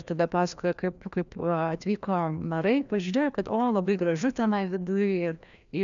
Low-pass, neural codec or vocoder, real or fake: 7.2 kHz; codec, 16 kHz, 1 kbps, FreqCodec, larger model; fake